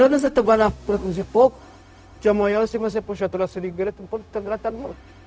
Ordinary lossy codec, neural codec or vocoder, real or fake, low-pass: none; codec, 16 kHz, 0.4 kbps, LongCat-Audio-Codec; fake; none